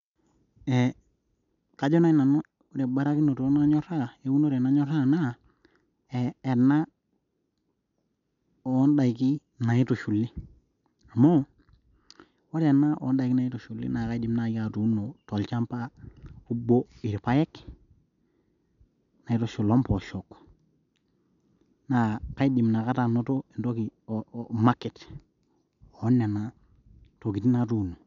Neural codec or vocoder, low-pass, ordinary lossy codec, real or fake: none; 7.2 kHz; none; real